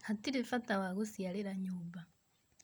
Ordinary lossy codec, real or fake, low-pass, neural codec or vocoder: none; real; none; none